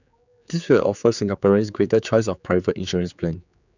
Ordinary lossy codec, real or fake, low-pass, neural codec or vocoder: none; fake; 7.2 kHz; codec, 16 kHz, 4 kbps, X-Codec, HuBERT features, trained on general audio